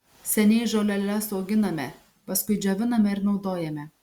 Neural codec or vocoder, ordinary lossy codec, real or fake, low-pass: none; Opus, 64 kbps; real; 19.8 kHz